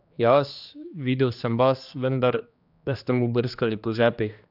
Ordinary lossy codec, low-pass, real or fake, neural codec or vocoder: none; 5.4 kHz; fake; codec, 16 kHz, 2 kbps, X-Codec, HuBERT features, trained on general audio